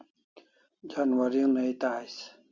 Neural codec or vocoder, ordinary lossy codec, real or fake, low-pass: none; Opus, 64 kbps; real; 7.2 kHz